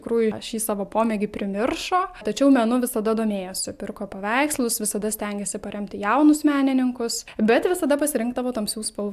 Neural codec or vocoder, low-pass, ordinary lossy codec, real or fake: none; 14.4 kHz; AAC, 96 kbps; real